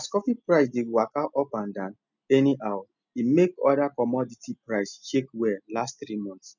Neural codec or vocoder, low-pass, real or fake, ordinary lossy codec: none; 7.2 kHz; real; none